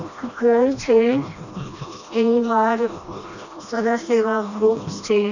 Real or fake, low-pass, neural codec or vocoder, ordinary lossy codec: fake; 7.2 kHz; codec, 16 kHz, 1 kbps, FreqCodec, smaller model; none